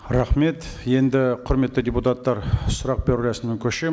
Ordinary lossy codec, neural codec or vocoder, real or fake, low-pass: none; none; real; none